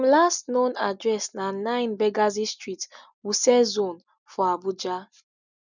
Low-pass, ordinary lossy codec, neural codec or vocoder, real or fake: 7.2 kHz; none; none; real